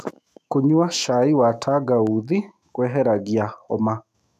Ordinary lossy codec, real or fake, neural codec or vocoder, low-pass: none; fake; autoencoder, 48 kHz, 128 numbers a frame, DAC-VAE, trained on Japanese speech; 14.4 kHz